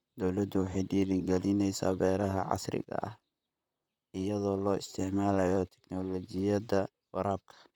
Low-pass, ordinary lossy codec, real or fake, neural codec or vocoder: 19.8 kHz; none; fake; vocoder, 44.1 kHz, 128 mel bands, Pupu-Vocoder